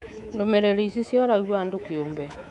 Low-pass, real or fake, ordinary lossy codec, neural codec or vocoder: 10.8 kHz; fake; none; codec, 24 kHz, 3.1 kbps, DualCodec